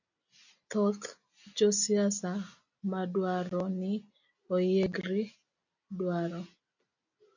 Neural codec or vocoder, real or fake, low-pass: none; real; 7.2 kHz